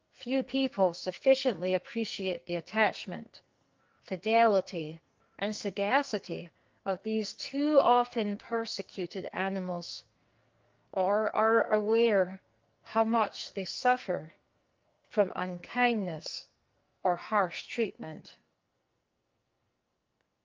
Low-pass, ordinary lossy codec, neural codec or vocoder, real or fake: 7.2 kHz; Opus, 24 kbps; codec, 44.1 kHz, 2.6 kbps, SNAC; fake